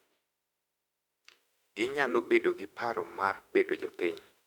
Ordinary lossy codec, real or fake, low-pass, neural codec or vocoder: none; fake; 19.8 kHz; autoencoder, 48 kHz, 32 numbers a frame, DAC-VAE, trained on Japanese speech